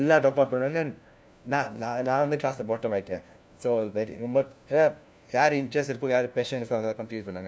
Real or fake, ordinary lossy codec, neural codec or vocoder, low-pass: fake; none; codec, 16 kHz, 1 kbps, FunCodec, trained on LibriTTS, 50 frames a second; none